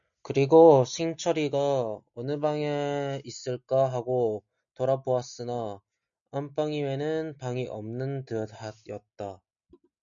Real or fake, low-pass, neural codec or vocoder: real; 7.2 kHz; none